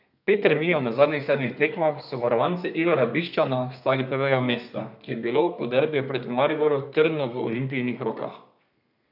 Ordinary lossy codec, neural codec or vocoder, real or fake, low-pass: none; codec, 32 kHz, 1.9 kbps, SNAC; fake; 5.4 kHz